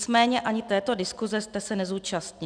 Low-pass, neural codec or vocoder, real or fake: 9.9 kHz; none; real